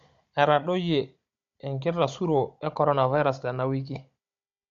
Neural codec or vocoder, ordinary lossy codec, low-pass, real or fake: codec, 16 kHz, 16 kbps, FunCodec, trained on Chinese and English, 50 frames a second; MP3, 48 kbps; 7.2 kHz; fake